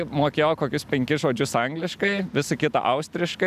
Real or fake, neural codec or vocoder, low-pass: real; none; 14.4 kHz